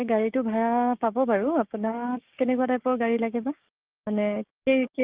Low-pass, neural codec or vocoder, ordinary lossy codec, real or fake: 3.6 kHz; codec, 16 kHz, 6 kbps, DAC; Opus, 32 kbps; fake